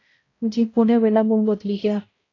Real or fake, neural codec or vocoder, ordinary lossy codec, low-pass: fake; codec, 16 kHz, 0.5 kbps, X-Codec, HuBERT features, trained on balanced general audio; AAC, 32 kbps; 7.2 kHz